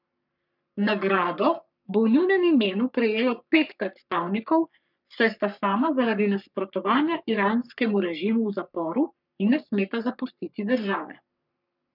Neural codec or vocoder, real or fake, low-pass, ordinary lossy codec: codec, 44.1 kHz, 3.4 kbps, Pupu-Codec; fake; 5.4 kHz; none